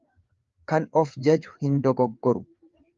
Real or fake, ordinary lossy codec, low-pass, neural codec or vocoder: fake; Opus, 32 kbps; 7.2 kHz; codec, 16 kHz, 4 kbps, FreqCodec, larger model